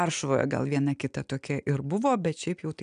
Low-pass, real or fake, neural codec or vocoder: 9.9 kHz; real; none